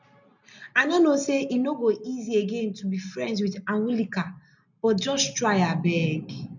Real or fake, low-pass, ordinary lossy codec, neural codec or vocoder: real; 7.2 kHz; none; none